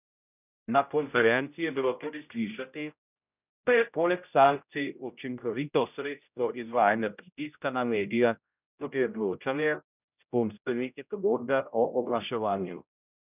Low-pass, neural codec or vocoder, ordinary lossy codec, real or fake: 3.6 kHz; codec, 16 kHz, 0.5 kbps, X-Codec, HuBERT features, trained on general audio; none; fake